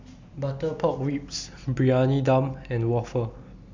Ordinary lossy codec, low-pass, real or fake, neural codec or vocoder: MP3, 64 kbps; 7.2 kHz; real; none